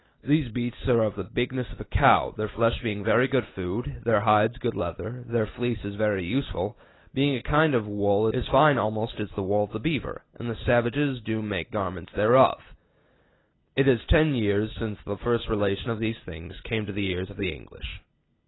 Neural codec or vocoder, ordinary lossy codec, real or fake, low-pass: none; AAC, 16 kbps; real; 7.2 kHz